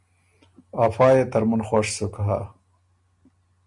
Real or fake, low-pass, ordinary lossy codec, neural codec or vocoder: real; 10.8 kHz; MP3, 96 kbps; none